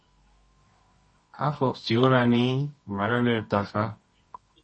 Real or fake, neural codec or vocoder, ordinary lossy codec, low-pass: fake; codec, 24 kHz, 0.9 kbps, WavTokenizer, medium music audio release; MP3, 32 kbps; 9.9 kHz